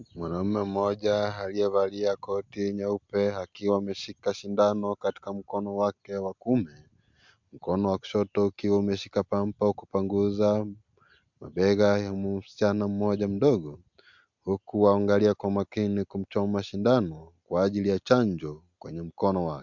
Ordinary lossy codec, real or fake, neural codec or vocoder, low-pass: MP3, 64 kbps; real; none; 7.2 kHz